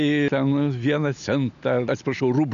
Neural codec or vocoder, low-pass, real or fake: none; 7.2 kHz; real